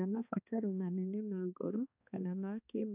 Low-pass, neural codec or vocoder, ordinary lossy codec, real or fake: 3.6 kHz; codec, 16 kHz, 2 kbps, X-Codec, HuBERT features, trained on balanced general audio; none; fake